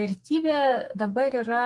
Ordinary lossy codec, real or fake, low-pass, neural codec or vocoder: Opus, 24 kbps; fake; 10.8 kHz; codec, 44.1 kHz, 2.6 kbps, SNAC